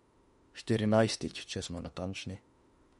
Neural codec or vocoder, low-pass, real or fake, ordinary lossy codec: autoencoder, 48 kHz, 32 numbers a frame, DAC-VAE, trained on Japanese speech; 19.8 kHz; fake; MP3, 48 kbps